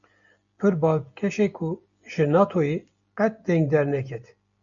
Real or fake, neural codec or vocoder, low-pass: real; none; 7.2 kHz